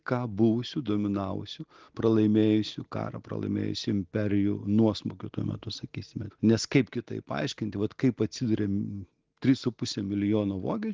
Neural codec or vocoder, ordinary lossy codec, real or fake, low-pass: none; Opus, 16 kbps; real; 7.2 kHz